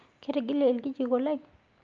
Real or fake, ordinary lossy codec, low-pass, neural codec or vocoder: real; Opus, 24 kbps; 7.2 kHz; none